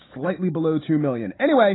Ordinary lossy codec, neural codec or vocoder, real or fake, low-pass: AAC, 16 kbps; none; real; 7.2 kHz